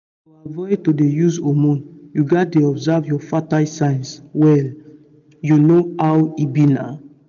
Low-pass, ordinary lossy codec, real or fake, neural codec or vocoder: 7.2 kHz; none; real; none